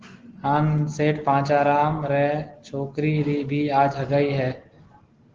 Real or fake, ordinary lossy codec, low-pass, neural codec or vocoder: real; Opus, 16 kbps; 7.2 kHz; none